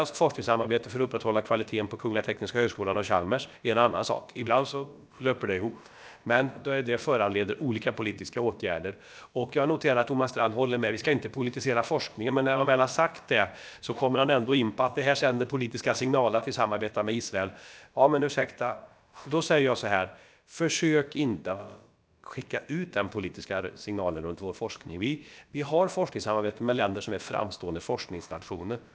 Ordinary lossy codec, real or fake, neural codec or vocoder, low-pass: none; fake; codec, 16 kHz, about 1 kbps, DyCAST, with the encoder's durations; none